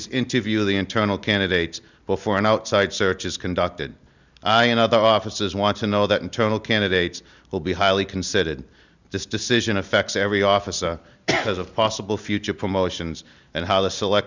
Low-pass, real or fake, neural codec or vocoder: 7.2 kHz; real; none